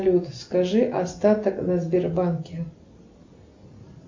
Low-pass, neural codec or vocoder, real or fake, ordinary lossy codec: 7.2 kHz; none; real; MP3, 48 kbps